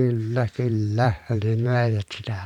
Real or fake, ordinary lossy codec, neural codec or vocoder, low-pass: fake; none; vocoder, 44.1 kHz, 128 mel bands every 512 samples, BigVGAN v2; 19.8 kHz